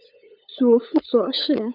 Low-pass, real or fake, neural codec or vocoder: 5.4 kHz; fake; codec, 16 kHz, 8 kbps, FunCodec, trained on LibriTTS, 25 frames a second